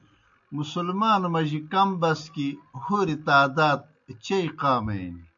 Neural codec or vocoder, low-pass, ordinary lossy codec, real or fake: none; 7.2 kHz; MP3, 64 kbps; real